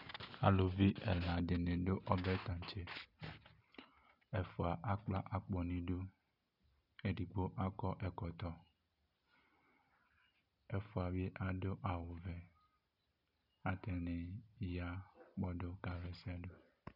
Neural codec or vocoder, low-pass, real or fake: none; 5.4 kHz; real